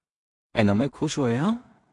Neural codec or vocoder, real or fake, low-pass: codec, 16 kHz in and 24 kHz out, 0.4 kbps, LongCat-Audio-Codec, two codebook decoder; fake; 10.8 kHz